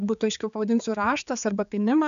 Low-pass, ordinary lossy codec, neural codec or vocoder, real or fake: 7.2 kHz; AAC, 96 kbps; codec, 16 kHz, 4 kbps, X-Codec, HuBERT features, trained on general audio; fake